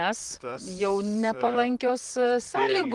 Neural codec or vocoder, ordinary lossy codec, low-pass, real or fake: vocoder, 44.1 kHz, 128 mel bands, Pupu-Vocoder; Opus, 24 kbps; 10.8 kHz; fake